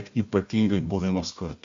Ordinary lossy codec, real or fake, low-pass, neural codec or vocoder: MP3, 48 kbps; fake; 7.2 kHz; codec, 16 kHz, 1 kbps, FunCodec, trained on Chinese and English, 50 frames a second